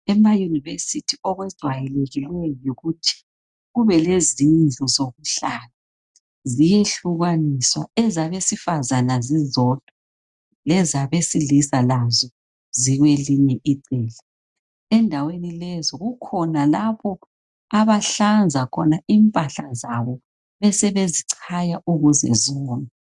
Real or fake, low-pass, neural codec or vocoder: real; 10.8 kHz; none